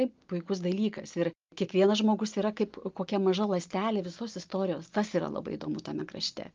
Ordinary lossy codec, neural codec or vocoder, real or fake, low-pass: Opus, 32 kbps; none; real; 7.2 kHz